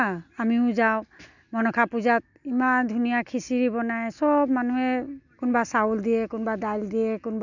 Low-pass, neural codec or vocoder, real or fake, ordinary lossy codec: 7.2 kHz; none; real; none